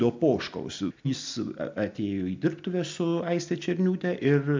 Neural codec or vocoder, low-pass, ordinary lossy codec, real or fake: none; 7.2 kHz; MP3, 64 kbps; real